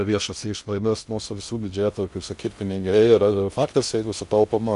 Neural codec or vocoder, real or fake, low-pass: codec, 16 kHz in and 24 kHz out, 0.6 kbps, FocalCodec, streaming, 2048 codes; fake; 10.8 kHz